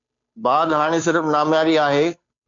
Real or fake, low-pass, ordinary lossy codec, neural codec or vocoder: fake; 7.2 kHz; MP3, 64 kbps; codec, 16 kHz, 2 kbps, FunCodec, trained on Chinese and English, 25 frames a second